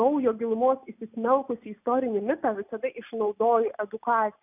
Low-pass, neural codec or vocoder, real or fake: 3.6 kHz; none; real